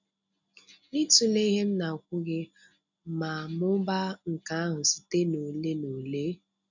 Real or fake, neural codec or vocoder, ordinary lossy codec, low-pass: real; none; none; 7.2 kHz